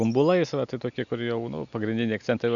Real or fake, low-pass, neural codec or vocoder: real; 7.2 kHz; none